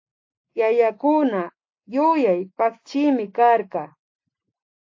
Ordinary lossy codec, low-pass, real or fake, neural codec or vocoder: AAC, 48 kbps; 7.2 kHz; real; none